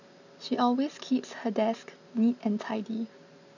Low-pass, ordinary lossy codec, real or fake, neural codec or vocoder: 7.2 kHz; none; real; none